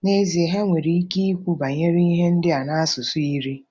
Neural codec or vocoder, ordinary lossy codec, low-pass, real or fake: none; none; none; real